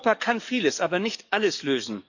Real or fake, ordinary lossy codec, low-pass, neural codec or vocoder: fake; none; 7.2 kHz; codec, 44.1 kHz, 7.8 kbps, DAC